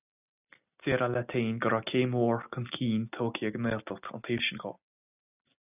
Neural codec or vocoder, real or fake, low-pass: none; real; 3.6 kHz